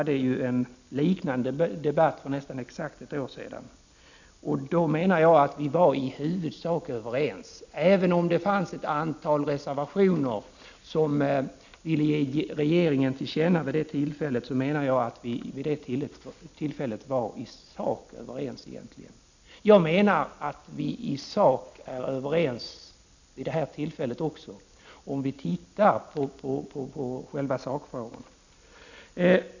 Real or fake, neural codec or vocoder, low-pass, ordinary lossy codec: real; none; 7.2 kHz; none